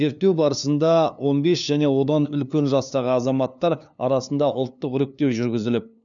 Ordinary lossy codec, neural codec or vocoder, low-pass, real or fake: none; codec, 16 kHz, 2 kbps, FunCodec, trained on LibriTTS, 25 frames a second; 7.2 kHz; fake